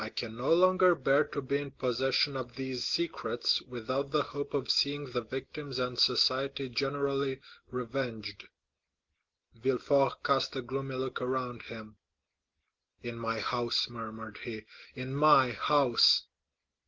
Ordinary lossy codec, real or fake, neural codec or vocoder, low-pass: Opus, 16 kbps; real; none; 7.2 kHz